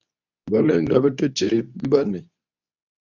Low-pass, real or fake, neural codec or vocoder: 7.2 kHz; fake; codec, 24 kHz, 0.9 kbps, WavTokenizer, medium speech release version 2